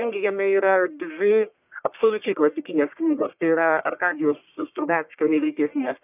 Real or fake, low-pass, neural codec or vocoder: fake; 3.6 kHz; codec, 44.1 kHz, 1.7 kbps, Pupu-Codec